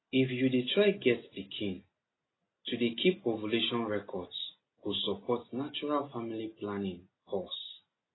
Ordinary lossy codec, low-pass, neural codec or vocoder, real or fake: AAC, 16 kbps; 7.2 kHz; none; real